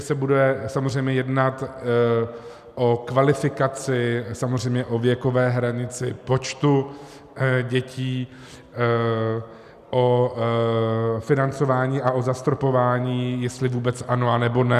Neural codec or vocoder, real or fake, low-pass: none; real; 14.4 kHz